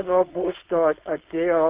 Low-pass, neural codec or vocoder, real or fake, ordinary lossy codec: 3.6 kHz; codec, 16 kHz, 4.8 kbps, FACodec; fake; Opus, 32 kbps